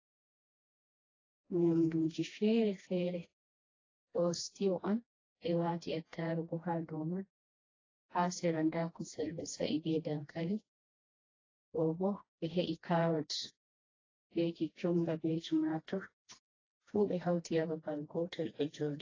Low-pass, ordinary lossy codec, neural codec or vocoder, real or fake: 7.2 kHz; AAC, 32 kbps; codec, 16 kHz, 1 kbps, FreqCodec, smaller model; fake